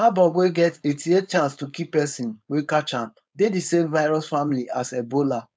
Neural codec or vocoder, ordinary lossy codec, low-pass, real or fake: codec, 16 kHz, 4.8 kbps, FACodec; none; none; fake